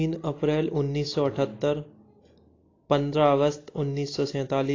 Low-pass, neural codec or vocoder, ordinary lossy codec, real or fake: 7.2 kHz; none; AAC, 32 kbps; real